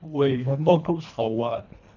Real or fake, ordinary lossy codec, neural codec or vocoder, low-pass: fake; none; codec, 24 kHz, 1.5 kbps, HILCodec; 7.2 kHz